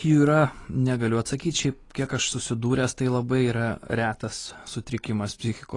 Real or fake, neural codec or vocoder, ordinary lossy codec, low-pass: real; none; AAC, 32 kbps; 10.8 kHz